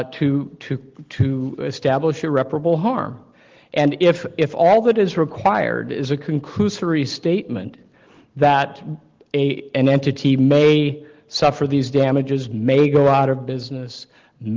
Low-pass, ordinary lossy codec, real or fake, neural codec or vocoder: 7.2 kHz; Opus, 16 kbps; real; none